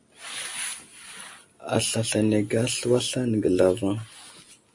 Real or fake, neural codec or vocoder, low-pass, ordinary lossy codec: real; none; 10.8 kHz; MP3, 48 kbps